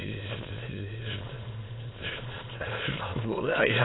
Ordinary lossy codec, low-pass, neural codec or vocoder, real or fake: AAC, 16 kbps; 7.2 kHz; autoencoder, 22.05 kHz, a latent of 192 numbers a frame, VITS, trained on many speakers; fake